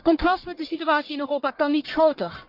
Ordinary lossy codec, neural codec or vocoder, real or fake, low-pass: Opus, 24 kbps; codec, 44.1 kHz, 1.7 kbps, Pupu-Codec; fake; 5.4 kHz